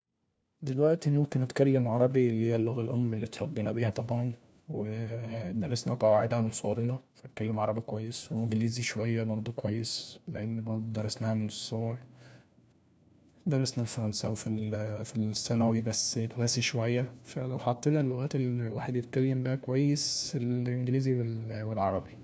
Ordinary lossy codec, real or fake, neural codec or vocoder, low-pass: none; fake; codec, 16 kHz, 1 kbps, FunCodec, trained on LibriTTS, 50 frames a second; none